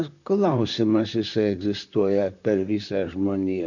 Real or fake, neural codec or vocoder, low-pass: fake; vocoder, 44.1 kHz, 128 mel bands, Pupu-Vocoder; 7.2 kHz